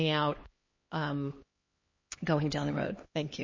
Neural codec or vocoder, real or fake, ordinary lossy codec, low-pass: codec, 16 kHz, 2 kbps, X-Codec, HuBERT features, trained on LibriSpeech; fake; MP3, 32 kbps; 7.2 kHz